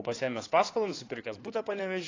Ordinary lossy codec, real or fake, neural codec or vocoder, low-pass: AAC, 32 kbps; fake; codec, 16 kHz in and 24 kHz out, 2.2 kbps, FireRedTTS-2 codec; 7.2 kHz